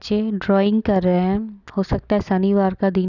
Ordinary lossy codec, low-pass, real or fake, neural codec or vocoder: none; 7.2 kHz; real; none